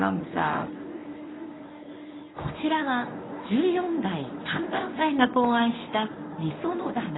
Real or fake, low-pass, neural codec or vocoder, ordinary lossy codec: fake; 7.2 kHz; codec, 24 kHz, 0.9 kbps, WavTokenizer, medium speech release version 1; AAC, 16 kbps